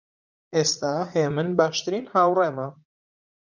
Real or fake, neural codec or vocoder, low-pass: fake; vocoder, 44.1 kHz, 80 mel bands, Vocos; 7.2 kHz